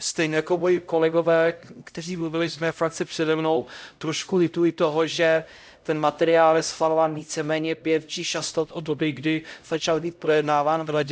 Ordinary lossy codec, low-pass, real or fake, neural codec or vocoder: none; none; fake; codec, 16 kHz, 0.5 kbps, X-Codec, HuBERT features, trained on LibriSpeech